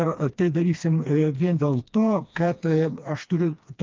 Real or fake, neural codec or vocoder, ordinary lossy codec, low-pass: fake; codec, 16 kHz, 2 kbps, FreqCodec, smaller model; Opus, 24 kbps; 7.2 kHz